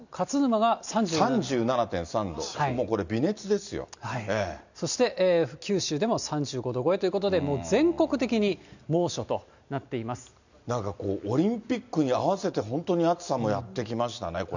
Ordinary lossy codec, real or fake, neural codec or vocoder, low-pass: none; real; none; 7.2 kHz